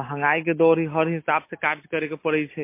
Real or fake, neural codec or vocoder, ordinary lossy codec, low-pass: real; none; MP3, 24 kbps; 3.6 kHz